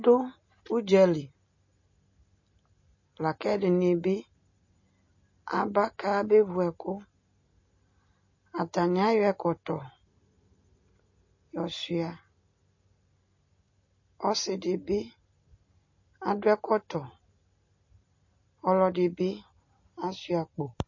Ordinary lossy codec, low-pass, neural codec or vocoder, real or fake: MP3, 32 kbps; 7.2 kHz; none; real